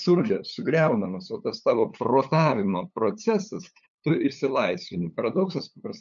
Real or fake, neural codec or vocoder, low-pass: fake; codec, 16 kHz, 8 kbps, FunCodec, trained on LibriTTS, 25 frames a second; 7.2 kHz